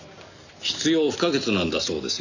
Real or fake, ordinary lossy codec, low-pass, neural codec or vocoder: real; none; 7.2 kHz; none